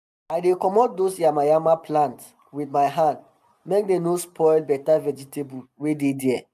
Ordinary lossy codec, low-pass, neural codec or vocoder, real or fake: none; 14.4 kHz; none; real